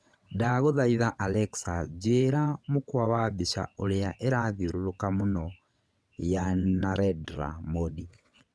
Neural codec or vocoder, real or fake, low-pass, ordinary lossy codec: vocoder, 22.05 kHz, 80 mel bands, WaveNeXt; fake; none; none